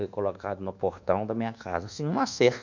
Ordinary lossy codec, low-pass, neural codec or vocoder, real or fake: none; 7.2 kHz; codec, 24 kHz, 1.2 kbps, DualCodec; fake